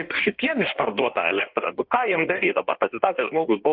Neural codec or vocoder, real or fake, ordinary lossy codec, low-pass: codec, 16 kHz in and 24 kHz out, 1.1 kbps, FireRedTTS-2 codec; fake; Opus, 32 kbps; 5.4 kHz